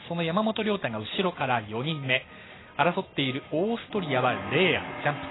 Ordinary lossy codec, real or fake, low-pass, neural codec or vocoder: AAC, 16 kbps; real; 7.2 kHz; none